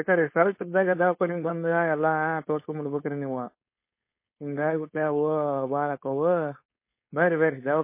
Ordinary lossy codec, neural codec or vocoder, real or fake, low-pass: MP3, 24 kbps; codec, 16 kHz, 4.8 kbps, FACodec; fake; 3.6 kHz